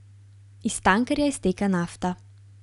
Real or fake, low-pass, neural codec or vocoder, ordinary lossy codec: real; 10.8 kHz; none; none